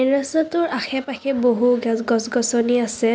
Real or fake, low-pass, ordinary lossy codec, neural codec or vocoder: real; none; none; none